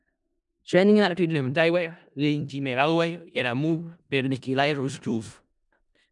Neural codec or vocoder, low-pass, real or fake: codec, 16 kHz in and 24 kHz out, 0.4 kbps, LongCat-Audio-Codec, four codebook decoder; 10.8 kHz; fake